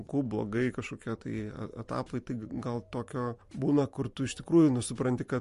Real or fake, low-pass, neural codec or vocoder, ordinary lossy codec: fake; 14.4 kHz; vocoder, 44.1 kHz, 128 mel bands every 512 samples, BigVGAN v2; MP3, 48 kbps